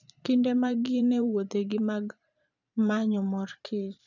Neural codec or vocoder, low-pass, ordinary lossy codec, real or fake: vocoder, 44.1 kHz, 128 mel bands every 512 samples, BigVGAN v2; 7.2 kHz; none; fake